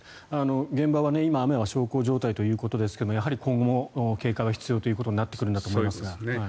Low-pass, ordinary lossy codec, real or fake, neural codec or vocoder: none; none; real; none